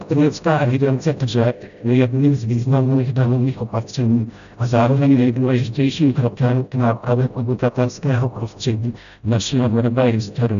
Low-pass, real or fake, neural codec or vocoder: 7.2 kHz; fake; codec, 16 kHz, 0.5 kbps, FreqCodec, smaller model